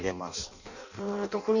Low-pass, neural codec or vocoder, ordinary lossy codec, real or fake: 7.2 kHz; codec, 16 kHz in and 24 kHz out, 0.6 kbps, FireRedTTS-2 codec; none; fake